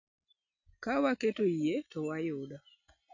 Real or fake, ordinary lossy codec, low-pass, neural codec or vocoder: real; none; 7.2 kHz; none